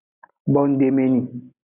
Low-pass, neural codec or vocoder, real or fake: 3.6 kHz; none; real